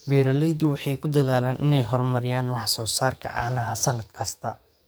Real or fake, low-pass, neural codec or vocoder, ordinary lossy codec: fake; none; codec, 44.1 kHz, 2.6 kbps, SNAC; none